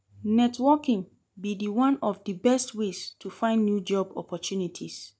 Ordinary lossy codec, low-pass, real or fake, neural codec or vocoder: none; none; real; none